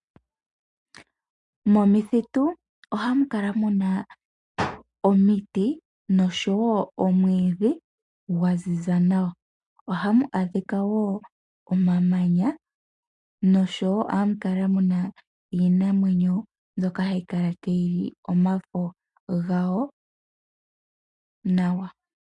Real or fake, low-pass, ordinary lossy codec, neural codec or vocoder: real; 10.8 kHz; MP3, 64 kbps; none